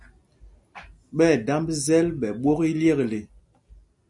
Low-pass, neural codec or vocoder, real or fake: 10.8 kHz; none; real